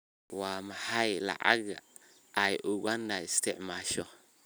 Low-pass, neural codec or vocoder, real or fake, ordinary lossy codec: none; none; real; none